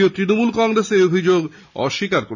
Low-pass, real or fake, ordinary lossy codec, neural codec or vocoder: 7.2 kHz; real; none; none